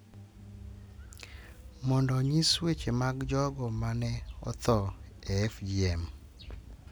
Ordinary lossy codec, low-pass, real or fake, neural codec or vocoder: none; none; real; none